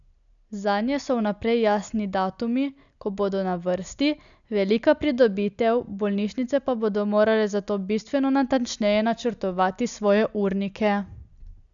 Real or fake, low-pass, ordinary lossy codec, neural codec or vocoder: real; 7.2 kHz; MP3, 96 kbps; none